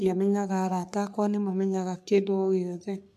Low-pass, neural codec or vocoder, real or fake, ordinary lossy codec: 14.4 kHz; codec, 44.1 kHz, 3.4 kbps, Pupu-Codec; fake; none